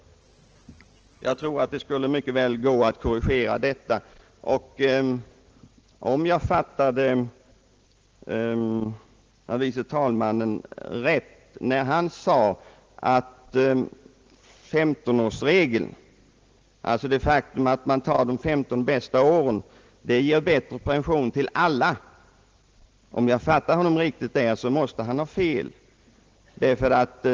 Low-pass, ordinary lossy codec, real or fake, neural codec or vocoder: 7.2 kHz; Opus, 16 kbps; real; none